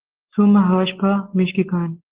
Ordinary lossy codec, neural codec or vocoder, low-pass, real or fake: Opus, 16 kbps; none; 3.6 kHz; real